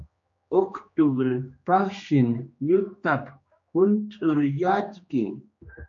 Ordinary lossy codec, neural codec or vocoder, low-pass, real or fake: MP3, 48 kbps; codec, 16 kHz, 2 kbps, X-Codec, HuBERT features, trained on general audio; 7.2 kHz; fake